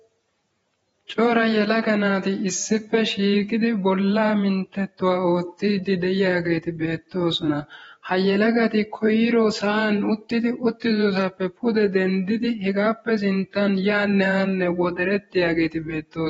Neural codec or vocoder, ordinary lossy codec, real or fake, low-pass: vocoder, 48 kHz, 128 mel bands, Vocos; AAC, 24 kbps; fake; 19.8 kHz